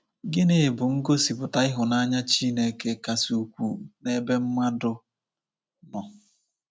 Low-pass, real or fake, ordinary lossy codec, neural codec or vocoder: none; real; none; none